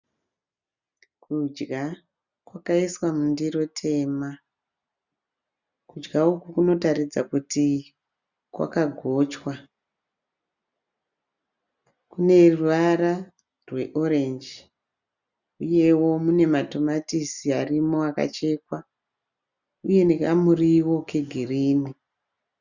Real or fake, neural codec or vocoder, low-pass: real; none; 7.2 kHz